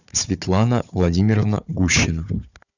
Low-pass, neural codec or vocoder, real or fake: 7.2 kHz; codec, 16 kHz, 4 kbps, FunCodec, trained on Chinese and English, 50 frames a second; fake